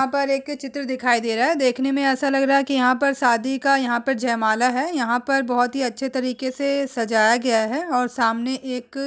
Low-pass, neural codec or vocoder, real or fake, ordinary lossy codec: none; none; real; none